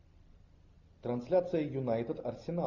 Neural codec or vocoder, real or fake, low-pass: none; real; 7.2 kHz